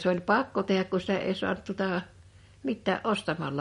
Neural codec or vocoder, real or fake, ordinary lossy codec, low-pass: none; real; MP3, 48 kbps; 10.8 kHz